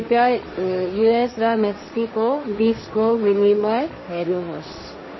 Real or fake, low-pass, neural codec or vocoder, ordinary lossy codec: fake; 7.2 kHz; codec, 16 kHz, 1.1 kbps, Voila-Tokenizer; MP3, 24 kbps